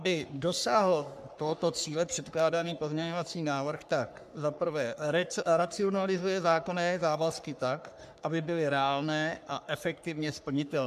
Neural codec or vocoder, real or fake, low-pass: codec, 44.1 kHz, 3.4 kbps, Pupu-Codec; fake; 14.4 kHz